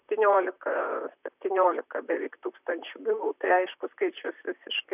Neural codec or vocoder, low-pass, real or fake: vocoder, 44.1 kHz, 128 mel bands, Pupu-Vocoder; 3.6 kHz; fake